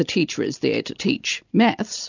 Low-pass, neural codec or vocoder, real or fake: 7.2 kHz; none; real